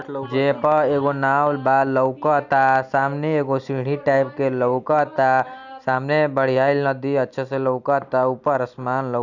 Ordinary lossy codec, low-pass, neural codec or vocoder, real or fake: none; 7.2 kHz; none; real